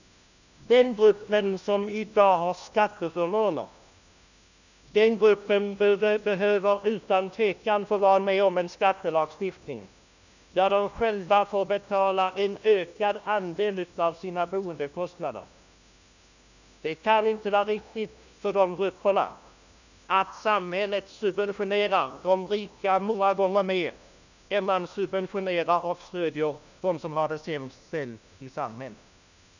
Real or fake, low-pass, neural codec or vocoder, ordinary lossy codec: fake; 7.2 kHz; codec, 16 kHz, 1 kbps, FunCodec, trained on LibriTTS, 50 frames a second; none